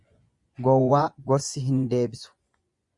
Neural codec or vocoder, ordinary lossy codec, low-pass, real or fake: vocoder, 24 kHz, 100 mel bands, Vocos; Opus, 64 kbps; 10.8 kHz; fake